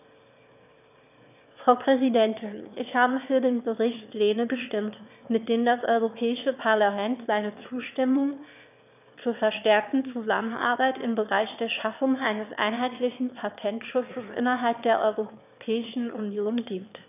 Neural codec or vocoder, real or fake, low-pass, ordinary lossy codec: autoencoder, 22.05 kHz, a latent of 192 numbers a frame, VITS, trained on one speaker; fake; 3.6 kHz; none